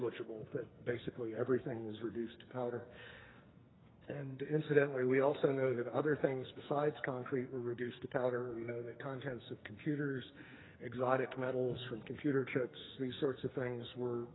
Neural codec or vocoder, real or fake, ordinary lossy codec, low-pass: codec, 44.1 kHz, 2.6 kbps, SNAC; fake; AAC, 16 kbps; 7.2 kHz